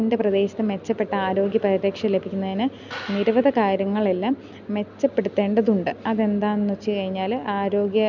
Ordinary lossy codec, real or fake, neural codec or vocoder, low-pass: none; real; none; 7.2 kHz